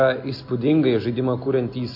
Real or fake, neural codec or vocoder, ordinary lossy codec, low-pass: real; none; MP3, 32 kbps; 5.4 kHz